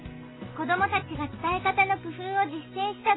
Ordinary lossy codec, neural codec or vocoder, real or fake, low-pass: AAC, 16 kbps; none; real; 7.2 kHz